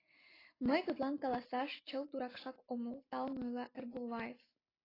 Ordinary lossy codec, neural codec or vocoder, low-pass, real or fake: AAC, 24 kbps; none; 5.4 kHz; real